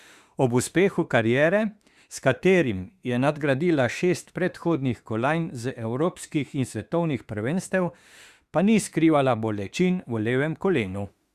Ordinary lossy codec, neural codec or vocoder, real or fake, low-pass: Opus, 64 kbps; autoencoder, 48 kHz, 32 numbers a frame, DAC-VAE, trained on Japanese speech; fake; 14.4 kHz